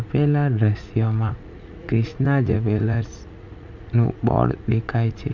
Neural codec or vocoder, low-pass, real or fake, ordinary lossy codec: vocoder, 44.1 kHz, 80 mel bands, Vocos; 7.2 kHz; fake; none